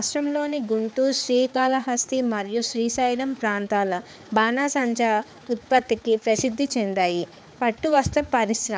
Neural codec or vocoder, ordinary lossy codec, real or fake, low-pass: codec, 16 kHz, 4 kbps, X-Codec, HuBERT features, trained on balanced general audio; none; fake; none